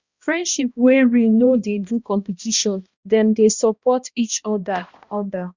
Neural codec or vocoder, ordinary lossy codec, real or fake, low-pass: codec, 16 kHz, 1 kbps, X-Codec, HuBERT features, trained on balanced general audio; Opus, 64 kbps; fake; 7.2 kHz